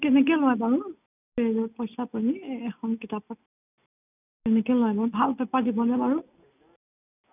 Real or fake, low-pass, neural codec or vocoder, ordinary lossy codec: real; 3.6 kHz; none; none